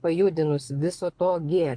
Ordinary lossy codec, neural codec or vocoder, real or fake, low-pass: AAC, 64 kbps; codec, 24 kHz, 6 kbps, HILCodec; fake; 9.9 kHz